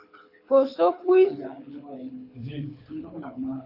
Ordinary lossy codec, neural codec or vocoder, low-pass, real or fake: AAC, 32 kbps; codec, 24 kHz, 6 kbps, HILCodec; 5.4 kHz; fake